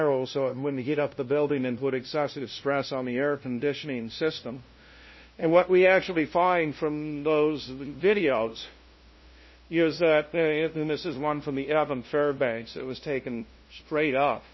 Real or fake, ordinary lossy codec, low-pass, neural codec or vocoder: fake; MP3, 24 kbps; 7.2 kHz; codec, 16 kHz, 0.5 kbps, FunCodec, trained on LibriTTS, 25 frames a second